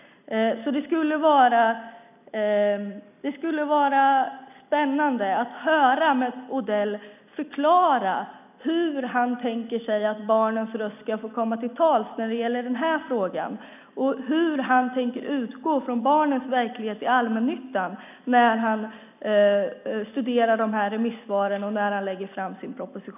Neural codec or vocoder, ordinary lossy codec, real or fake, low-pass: none; none; real; 3.6 kHz